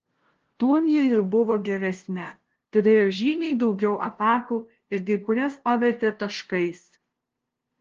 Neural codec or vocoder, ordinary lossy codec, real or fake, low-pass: codec, 16 kHz, 0.5 kbps, FunCodec, trained on LibriTTS, 25 frames a second; Opus, 16 kbps; fake; 7.2 kHz